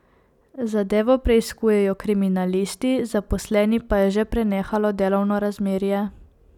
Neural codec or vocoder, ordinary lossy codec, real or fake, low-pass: none; none; real; 19.8 kHz